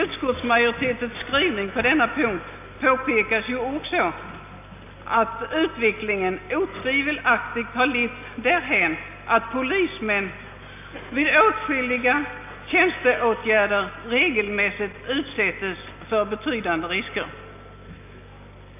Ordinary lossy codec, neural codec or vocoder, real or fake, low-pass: none; none; real; 3.6 kHz